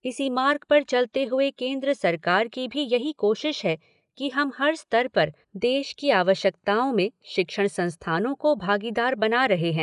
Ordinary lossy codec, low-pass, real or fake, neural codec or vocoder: none; 10.8 kHz; real; none